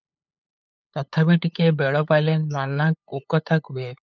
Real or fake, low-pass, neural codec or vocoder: fake; 7.2 kHz; codec, 16 kHz, 8 kbps, FunCodec, trained on LibriTTS, 25 frames a second